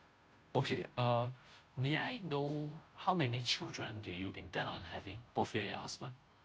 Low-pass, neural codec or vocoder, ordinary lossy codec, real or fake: none; codec, 16 kHz, 0.5 kbps, FunCodec, trained on Chinese and English, 25 frames a second; none; fake